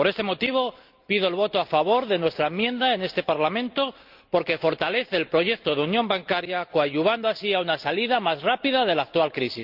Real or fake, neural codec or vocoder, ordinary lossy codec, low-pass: real; none; Opus, 24 kbps; 5.4 kHz